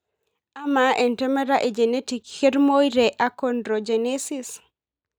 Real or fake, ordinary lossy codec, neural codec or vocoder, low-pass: real; none; none; none